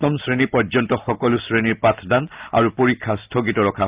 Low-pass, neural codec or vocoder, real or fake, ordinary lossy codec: 3.6 kHz; none; real; Opus, 32 kbps